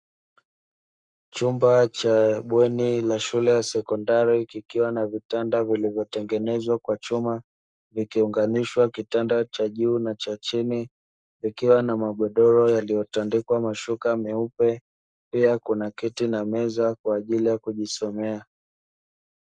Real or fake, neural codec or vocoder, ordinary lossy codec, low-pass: fake; codec, 44.1 kHz, 7.8 kbps, Pupu-Codec; Opus, 64 kbps; 9.9 kHz